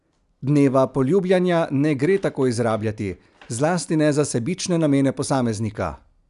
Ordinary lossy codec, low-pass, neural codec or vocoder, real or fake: none; 10.8 kHz; none; real